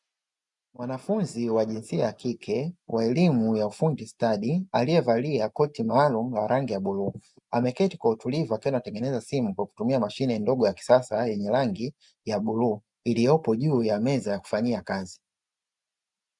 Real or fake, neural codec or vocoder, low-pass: real; none; 10.8 kHz